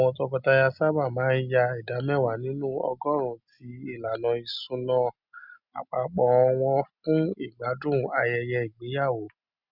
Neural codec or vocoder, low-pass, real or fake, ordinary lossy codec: none; 5.4 kHz; real; none